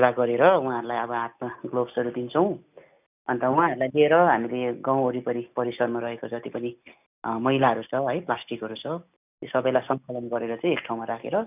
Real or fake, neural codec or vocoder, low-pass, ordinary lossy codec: real; none; 3.6 kHz; none